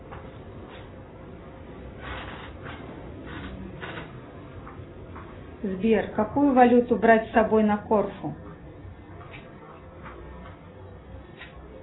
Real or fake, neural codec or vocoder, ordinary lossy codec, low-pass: real; none; AAC, 16 kbps; 7.2 kHz